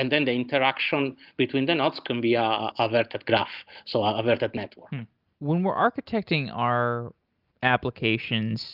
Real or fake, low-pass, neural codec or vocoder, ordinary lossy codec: real; 5.4 kHz; none; Opus, 24 kbps